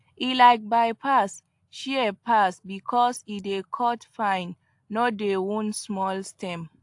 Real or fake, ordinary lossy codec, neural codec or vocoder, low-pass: real; AAC, 64 kbps; none; 10.8 kHz